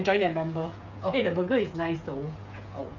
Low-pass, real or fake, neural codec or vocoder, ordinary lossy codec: 7.2 kHz; fake; codec, 16 kHz, 8 kbps, FreqCodec, smaller model; none